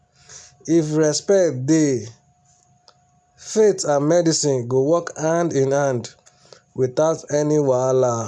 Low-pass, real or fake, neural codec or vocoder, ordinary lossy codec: none; real; none; none